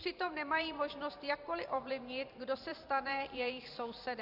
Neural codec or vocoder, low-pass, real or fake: vocoder, 44.1 kHz, 128 mel bands every 512 samples, BigVGAN v2; 5.4 kHz; fake